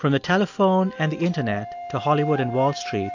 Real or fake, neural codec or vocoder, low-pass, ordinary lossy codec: real; none; 7.2 kHz; AAC, 48 kbps